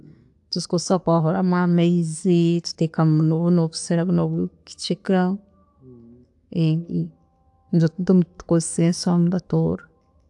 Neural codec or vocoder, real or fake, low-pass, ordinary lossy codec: none; real; 10.8 kHz; AAC, 64 kbps